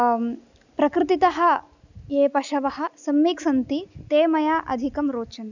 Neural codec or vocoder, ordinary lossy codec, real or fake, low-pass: none; none; real; 7.2 kHz